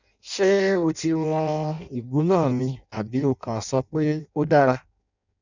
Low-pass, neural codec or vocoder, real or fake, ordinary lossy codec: 7.2 kHz; codec, 16 kHz in and 24 kHz out, 0.6 kbps, FireRedTTS-2 codec; fake; none